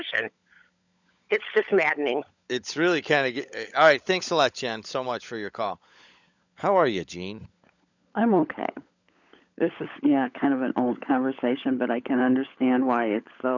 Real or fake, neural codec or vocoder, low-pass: fake; codec, 16 kHz, 16 kbps, FunCodec, trained on LibriTTS, 50 frames a second; 7.2 kHz